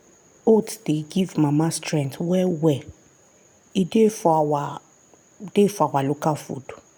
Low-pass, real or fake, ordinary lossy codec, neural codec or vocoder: none; real; none; none